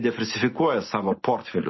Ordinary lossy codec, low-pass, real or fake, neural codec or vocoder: MP3, 24 kbps; 7.2 kHz; real; none